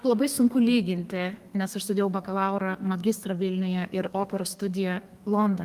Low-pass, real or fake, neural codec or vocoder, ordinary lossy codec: 14.4 kHz; fake; codec, 32 kHz, 1.9 kbps, SNAC; Opus, 32 kbps